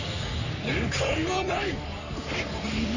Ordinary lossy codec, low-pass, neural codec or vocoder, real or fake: none; 7.2 kHz; codec, 44.1 kHz, 3.4 kbps, Pupu-Codec; fake